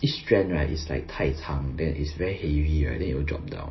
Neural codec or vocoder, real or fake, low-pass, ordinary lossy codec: none; real; 7.2 kHz; MP3, 24 kbps